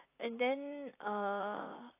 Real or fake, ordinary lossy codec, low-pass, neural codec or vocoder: fake; AAC, 24 kbps; 3.6 kHz; vocoder, 44.1 kHz, 128 mel bands, Pupu-Vocoder